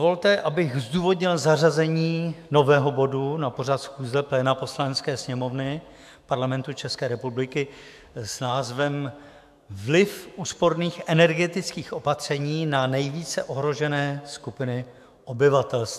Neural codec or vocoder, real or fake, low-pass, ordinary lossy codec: autoencoder, 48 kHz, 128 numbers a frame, DAC-VAE, trained on Japanese speech; fake; 14.4 kHz; AAC, 96 kbps